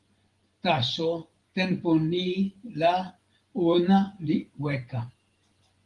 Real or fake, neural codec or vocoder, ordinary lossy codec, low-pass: fake; vocoder, 24 kHz, 100 mel bands, Vocos; Opus, 24 kbps; 10.8 kHz